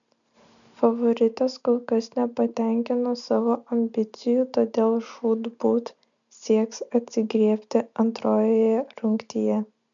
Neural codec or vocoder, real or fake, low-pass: none; real; 7.2 kHz